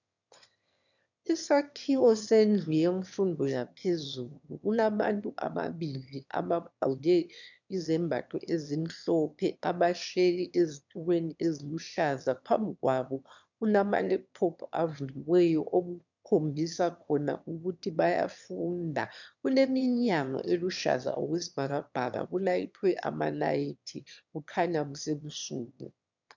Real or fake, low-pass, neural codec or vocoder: fake; 7.2 kHz; autoencoder, 22.05 kHz, a latent of 192 numbers a frame, VITS, trained on one speaker